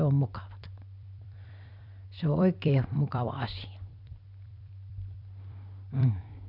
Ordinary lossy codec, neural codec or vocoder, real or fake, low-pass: none; vocoder, 44.1 kHz, 128 mel bands every 256 samples, BigVGAN v2; fake; 5.4 kHz